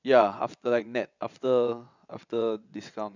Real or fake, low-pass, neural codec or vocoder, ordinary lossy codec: fake; 7.2 kHz; vocoder, 22.05 kHz, 80 mel bands, WaveNeXt; none